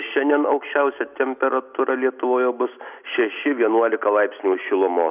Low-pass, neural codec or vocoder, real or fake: 3.6 kHz; none; real